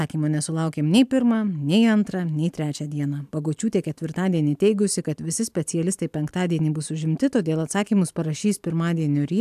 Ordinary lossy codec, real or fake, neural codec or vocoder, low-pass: AAC, 96 kbps; real; none; 14.4 kHz